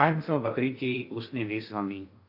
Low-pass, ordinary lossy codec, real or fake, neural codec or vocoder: 5.4 kHz; AAC, 32 kbps; fake; codec, 16 kHz in and 24 kHz out, 0.6 kbps, FocalCodec, streaming, 2048 codes